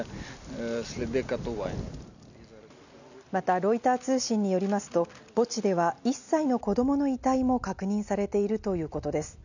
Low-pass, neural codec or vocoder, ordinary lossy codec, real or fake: 7.2 kHz; none; none; real